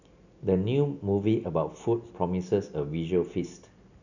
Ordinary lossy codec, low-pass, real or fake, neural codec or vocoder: none; 7.2 kHz; real; none